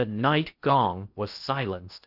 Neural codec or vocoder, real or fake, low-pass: codec, 16 kHz in and 24 kHz out, 0.8 kbps, FocalCodec, streaming, 65536 codes; fake; 5.4 kHz